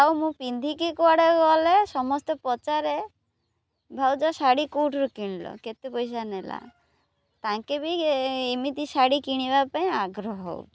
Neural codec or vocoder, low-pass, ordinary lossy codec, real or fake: none; none; none; real